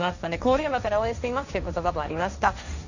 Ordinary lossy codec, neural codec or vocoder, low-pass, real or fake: none; codec, 16 kHz, 1.1 kbps, Voila-Tokenizer; 7.2 kHz; fake